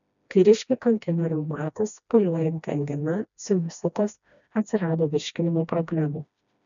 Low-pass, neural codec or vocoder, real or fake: 7.2 kHz; codec, 16 kHz, 1 kbps, FreqCodec, smaller model; fake